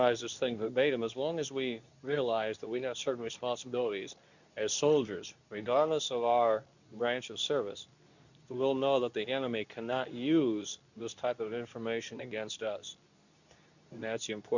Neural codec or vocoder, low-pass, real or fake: codec, 24 kHz, 0.9 kbps, WavTokenizer, medium speech release version 2; 7.2 kHz; fake